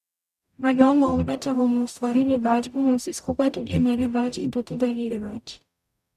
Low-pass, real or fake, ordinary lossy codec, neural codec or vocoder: 14.4 kHz; fake; none; codec, 44.1 kHz, 0.9 kbps, DAC